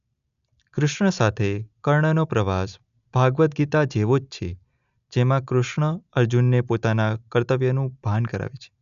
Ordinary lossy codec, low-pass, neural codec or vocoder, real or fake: none; 7.2 kHz; none; real